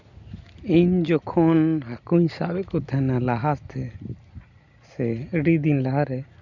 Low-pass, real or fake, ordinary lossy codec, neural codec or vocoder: 7.2 kHz; real; none; none